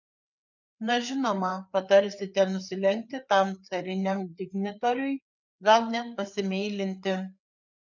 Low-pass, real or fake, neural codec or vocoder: 7.2 kHz; fake; codec, 16 kHz, 4 kbps, FreqCodec, larger model